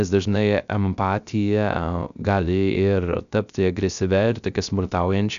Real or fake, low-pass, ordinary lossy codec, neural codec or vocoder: fake; 7.2 kHz; MP3, 96 kbps; codec, 16 kHz, 0.3 kbps, FocalCodec